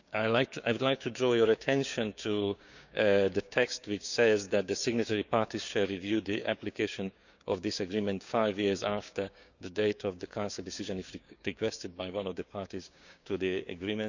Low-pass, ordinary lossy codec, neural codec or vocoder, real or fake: 7.2 kHz; none; codec, 16 kHz, 6 kbps, DAC; fake